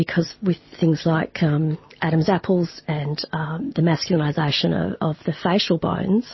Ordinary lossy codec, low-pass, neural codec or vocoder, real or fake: MP3, 24 kbps; 7.2 kHz; none; real